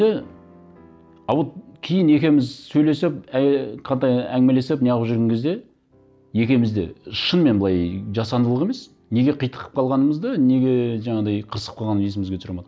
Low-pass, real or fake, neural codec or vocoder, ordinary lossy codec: none; real; none; none